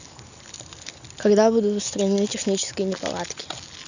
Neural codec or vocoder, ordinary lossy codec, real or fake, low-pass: none; none; real; 7.2 kHz